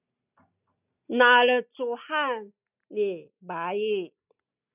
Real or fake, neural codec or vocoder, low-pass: real; none; 3.6 kHz